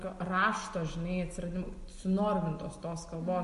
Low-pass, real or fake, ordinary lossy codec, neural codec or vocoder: 14.4 kHz; real; MP3, 48 kbps; none